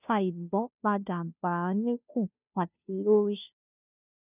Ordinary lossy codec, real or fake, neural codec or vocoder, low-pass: none; fake; codec, 16 kHz, 0.5 kbps, FunCodec, trained on Chinese and English, 25 frames a second; 3.6 kHz